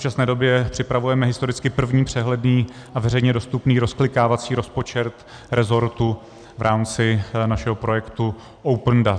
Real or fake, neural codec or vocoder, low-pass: real; none; 9.9 kHz